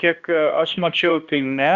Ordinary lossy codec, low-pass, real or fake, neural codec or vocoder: Opus, 64 kbps; 7.2 kHz; fake; codec, 16 kHz, 1 kbps, X-Codec, HuBERT features, trained on balanced general audio